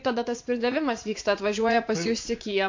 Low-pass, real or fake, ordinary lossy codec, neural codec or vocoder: 7.2 kHz; fake; MP3, 48 kbps; vocoder, 44.1 kHz, 80 mel bands, Vocos